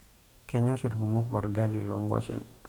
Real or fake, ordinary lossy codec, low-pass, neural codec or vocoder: fake; none; 19.8 kHz; codec, 44.1 kHz, 2.6 kbps, DAC